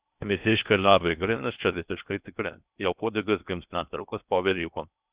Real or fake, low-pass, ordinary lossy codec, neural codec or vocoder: fake; 3.6 kHz; Opus, 64 kbps; codec, 16 kHz in and 24 kHz out, 0.6 kbps, FocalCodec, streaming, 2048 codes